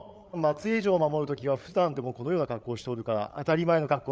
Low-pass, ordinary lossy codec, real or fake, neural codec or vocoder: none; none; fake; codec, 16 kHz, 8 kbps, FreqCodec, larger model